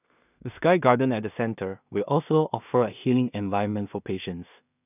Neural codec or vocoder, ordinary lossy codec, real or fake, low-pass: codec, 16 kHz in and 24 kHz out, 0.4 kbps, LongCat-Audio-Codec, two codebook decoder; none; fake; 3.6 kHz